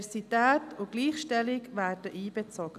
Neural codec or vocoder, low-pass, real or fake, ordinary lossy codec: none; 14.4 kHz; real; none